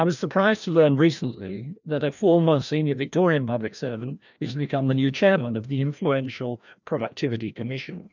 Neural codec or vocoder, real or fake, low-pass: codec, 16 kHz, 1 kbps, FreqCodec, larger model; fake; 7.2 kHz